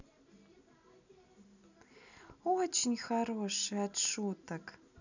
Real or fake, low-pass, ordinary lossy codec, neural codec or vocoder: real; 7.2 kHz; none; none